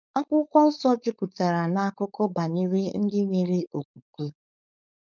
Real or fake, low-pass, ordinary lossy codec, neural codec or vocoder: fake; 7.2 kHz; none; codec, 16 kHz, 4.8 kbps, FACodec